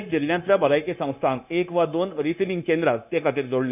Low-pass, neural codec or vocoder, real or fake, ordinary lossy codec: 3.6 kHz; codec, 16 kHz, 0.9 kbps, LongCat-Audio-Codec; fake; none